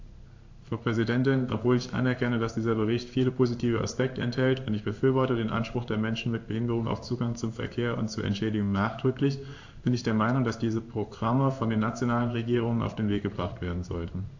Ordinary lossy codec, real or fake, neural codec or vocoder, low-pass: AAC, 48 kbps; fake; codec, 16 kHz in and 24 kHz out, 1 kbps, XY-Tokenizer; 7.2 kHz